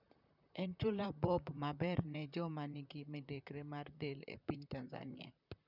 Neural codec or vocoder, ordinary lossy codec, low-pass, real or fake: vocoder, 44.1 kHz, 128 mel bands, Pupu-Vocoder; none; 5.4 kHz; fake